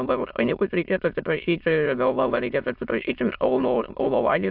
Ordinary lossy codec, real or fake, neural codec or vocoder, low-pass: AAC, 48 kbps; fake; autoencoder, 22.05 kHz, a latent of 192 numbers a frame, VITS, trained on many speakers; 5.4 kHz